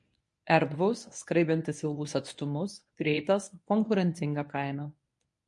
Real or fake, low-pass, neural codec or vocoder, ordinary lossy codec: fake; 10.8 kHz; codec, 24 kHz, 0.9 kbps, WavTokenizer, medium speech release version 1; MP3, 48 kbps